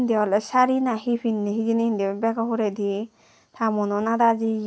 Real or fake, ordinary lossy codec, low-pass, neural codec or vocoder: real; none; none; none